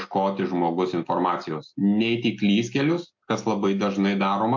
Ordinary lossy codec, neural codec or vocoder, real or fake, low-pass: MP3, 48 kbps; none; real; 7.2 kHz